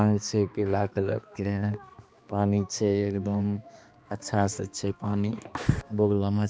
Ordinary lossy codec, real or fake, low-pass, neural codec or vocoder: none; fake; none; codec, 16 kHz, 2 kbps, X-Codec, HuBERT features, trained on balanced general audio